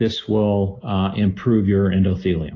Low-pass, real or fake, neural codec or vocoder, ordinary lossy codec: 7.2 kHz; real; none; AAC, 32 kbps